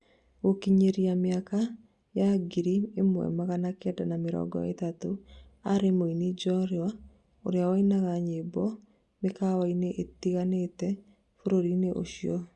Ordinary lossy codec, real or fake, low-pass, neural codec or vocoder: Opus, 64 kbps; real; 10.8 kHz; none